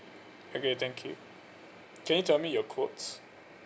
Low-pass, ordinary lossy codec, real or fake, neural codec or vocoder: none; none; real; none